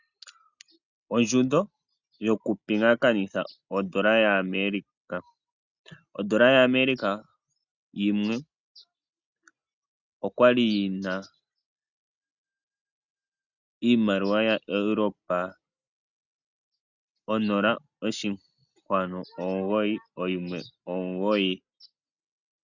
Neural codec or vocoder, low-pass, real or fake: none; 7.2 kHz; real